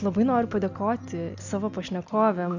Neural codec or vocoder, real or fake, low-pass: none; real; 7.2 kHz